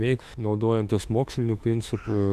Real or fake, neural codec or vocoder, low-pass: fake; autoencoder, 48 kHz, 32 numbers a frame, DAC-VAE, trained on Japanese speech; 14.4 kHz